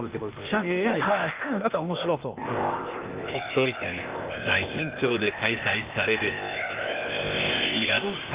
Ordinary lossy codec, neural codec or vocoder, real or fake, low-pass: Opus, 32 kbps; codec, 16 kHz, 0.8 kbps, ZipCodec; fake; 3.6 kHz